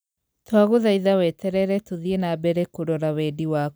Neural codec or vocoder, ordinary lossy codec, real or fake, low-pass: none; none; real; none